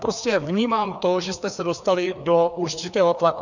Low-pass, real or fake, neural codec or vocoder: 7.2 kHz; fake; codec, 16 kHz, 2 kbps, FreqCodec, larger model